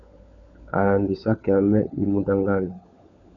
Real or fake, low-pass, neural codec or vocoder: fake; 7.2 kHz; codec, 16 kHz, 16 kbps, FunCodec, trained on LibriTTS, 50 frames a second